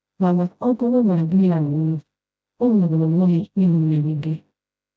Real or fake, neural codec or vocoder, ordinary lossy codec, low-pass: fake; codec, 16 kHz, 0.5 kbps, FreqCodec, smaller model; none; none